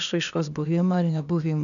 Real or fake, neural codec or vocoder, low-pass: fake; codec, 16 kHz, 0.8 kbps, ZipCodec; 7.2 kHz